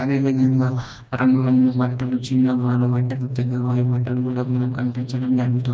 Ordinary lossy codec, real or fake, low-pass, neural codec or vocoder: none; fake; none; codec, 16 kHz, 1 kbps, FreqCodec, smaller model